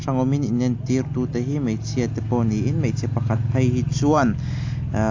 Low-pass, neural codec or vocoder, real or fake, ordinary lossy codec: 7.2 kHz; none; real; AAC, 48 kbps